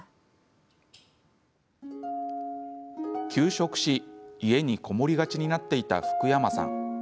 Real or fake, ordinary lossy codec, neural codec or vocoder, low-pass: real; none; none; none